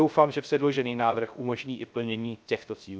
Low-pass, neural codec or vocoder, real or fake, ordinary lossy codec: none; codec, 16 kHz, 0.3 kbps, FocalCodec; fake; none